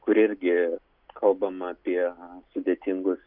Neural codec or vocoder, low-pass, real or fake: none; 5.4 kHz; real